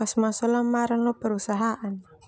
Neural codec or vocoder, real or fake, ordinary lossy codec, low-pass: none; real; none; none